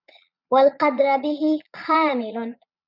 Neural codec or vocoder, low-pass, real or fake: vocoder, 22.05 kHz, 80 mel bands, Vocos; 5.4 kHz; fake